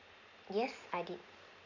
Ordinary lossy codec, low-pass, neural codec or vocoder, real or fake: none; 7.2 kHz; none; real